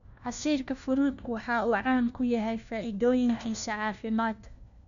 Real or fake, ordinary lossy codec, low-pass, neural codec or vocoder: fake; none; 7.2 kHz; codec, 16 kHz, 1 kbps, FunCodec, trained on LibriTTS, 50 frames a second